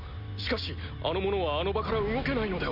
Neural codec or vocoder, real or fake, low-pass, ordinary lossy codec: none; real; 5.4 kHz; none